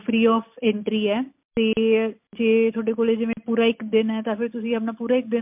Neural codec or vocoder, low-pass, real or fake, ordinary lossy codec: none; 3.6 kHz; real; MP3, 32 kbps